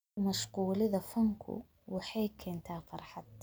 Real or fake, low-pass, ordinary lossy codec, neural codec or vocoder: real; none; none; none